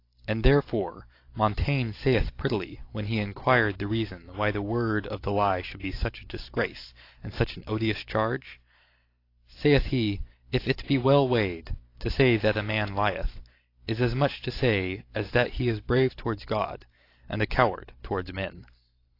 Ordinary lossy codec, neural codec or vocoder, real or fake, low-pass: AAC, 32 kbps; vocoder, 44.1 kHz, 128 mel bands every 512 samples, BigVGAN v2; fake; 5.4 kHz